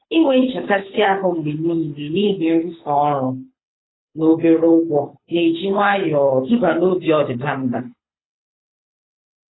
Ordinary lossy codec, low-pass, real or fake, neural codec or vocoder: AAC, 16 kbps; 7.2 kHz; fake; codec, 24 kHz, 6 kbps, HILCodec